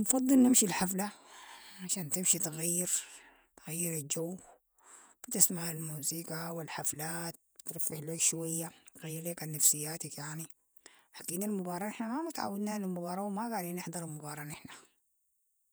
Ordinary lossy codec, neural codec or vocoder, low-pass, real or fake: none; vocoder, 48 kHz, 128 mel bands, Vocos; none; fake